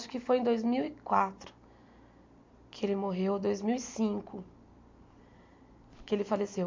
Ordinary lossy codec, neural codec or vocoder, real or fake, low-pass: MP3, 48 kbps; none; real; 7.2 kHz